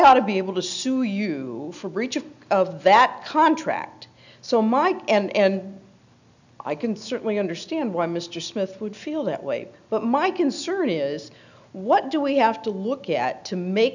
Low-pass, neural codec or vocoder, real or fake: 7.2 kHz; none; real